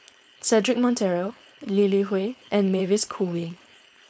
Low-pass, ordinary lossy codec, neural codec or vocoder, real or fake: none; none; codec, 16 kHz, 4.8 kbps, FACodec; fake